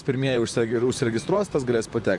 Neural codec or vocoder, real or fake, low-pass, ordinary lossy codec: vocoder, 44.1 kHz, 128 mel bands, Pupu-Vocoder; fake; 10.8 kHz; MP3, 64 kbps